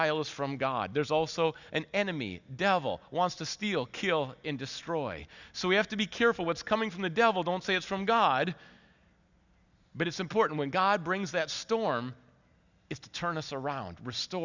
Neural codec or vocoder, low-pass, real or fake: none; 7.2 kHz; real